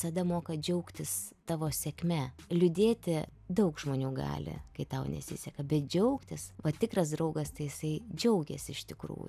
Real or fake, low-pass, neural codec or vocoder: real; 14.4 kHz; none